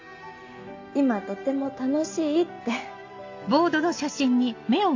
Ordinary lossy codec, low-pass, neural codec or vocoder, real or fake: AAC, 48 kbps; 7.2 kHz; none; real